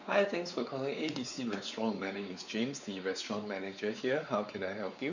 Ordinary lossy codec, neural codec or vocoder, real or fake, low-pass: none; codec, 16 kHz, 4 kbps, X-Codec, WavLM features, trained on Multilingual LibriSpeech; fake; 7.2 kHz